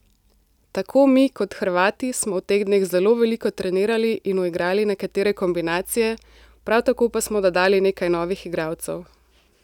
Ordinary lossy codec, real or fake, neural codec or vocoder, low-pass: none; real; none; 19.8 kHz